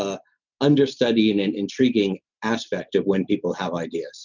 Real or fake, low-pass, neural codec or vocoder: real; 7.2 kHz; none